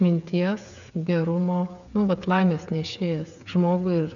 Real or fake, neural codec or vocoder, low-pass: fake; codec, 16 kHz, 8 kbps, FreqCodec, smaller model; 7.2 kHz